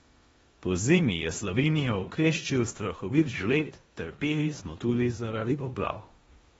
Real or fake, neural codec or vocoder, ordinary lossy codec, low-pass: fake; codec, 16 kHz in and 24 kHz out, 0.9 kbps, LongCat-Audio-Codec, four codebook decoder; AAC, 24 kbps; 10.8 kHz